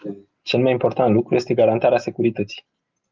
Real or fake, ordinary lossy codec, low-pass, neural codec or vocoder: real; Opus, 24 kbps; 7.2 kHz; none